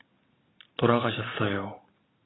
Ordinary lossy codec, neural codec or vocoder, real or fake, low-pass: AAC, 16 kbps; none; real; 7.2 kHz